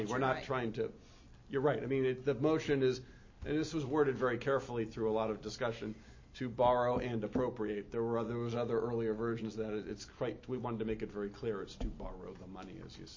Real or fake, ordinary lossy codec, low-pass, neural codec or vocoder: real; MP3, 32 kbps; 7.2 kHz; none